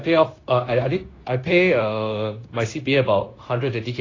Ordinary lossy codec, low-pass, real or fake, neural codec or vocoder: AAC, 32 kbps; 7.2 kHz; fake; codec, 16 kHz, 0.9 kbps, LongCat-Audio-Codec